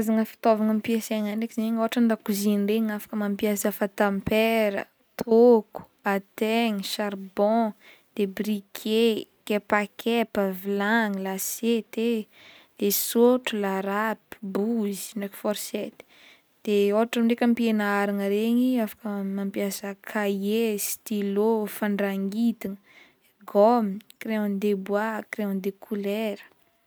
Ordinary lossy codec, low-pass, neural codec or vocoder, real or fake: none; none; none; real